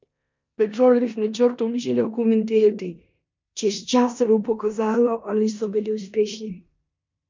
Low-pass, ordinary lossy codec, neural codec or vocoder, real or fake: 7.2 kHz; MP3, 64 kbps; codec, 16 kHz in and 24 kHz out, 0.9 kbps, LongCat-Audio-Codec, four codebook decoder; fake